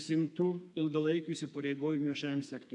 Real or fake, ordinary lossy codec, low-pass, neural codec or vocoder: fake; AAC, 64 kbps; 10.8 kHz; codec, 32 kHz, 1.9 kbps, SNAC